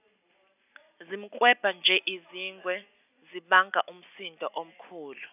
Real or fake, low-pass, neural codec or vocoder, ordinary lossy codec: real; 3.6 kHz; none; none